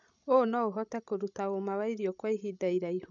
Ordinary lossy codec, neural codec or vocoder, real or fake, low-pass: none; codec, 16 kHz, 16 kbps, FreqCodec, larger model; fake; 7.2 kHz